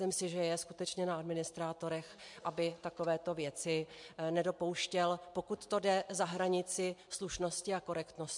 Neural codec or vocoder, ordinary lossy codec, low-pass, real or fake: none; MP3, 64 kbps; 10.8 kHz; real